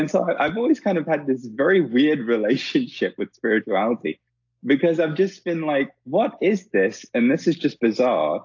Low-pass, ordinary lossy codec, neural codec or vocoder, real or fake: 7.2 kHz; AAC, 48 kbps; none; real